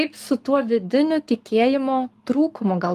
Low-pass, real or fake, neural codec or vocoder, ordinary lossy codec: 14.4 kHz; fake; codec, 44.1 kHz, 7.8 kbps, Pupu-Codec; Opus, 32 kbps